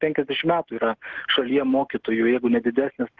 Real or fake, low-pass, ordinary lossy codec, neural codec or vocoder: real; 7.2 kHz; Opus, 16 kbps; none